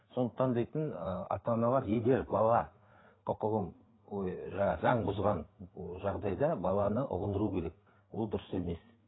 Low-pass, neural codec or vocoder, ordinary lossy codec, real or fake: 7.2 kHz; codec, 16 kHz, 4 kbps, FreqCodec, larger model; AAC, 16 kbps; fake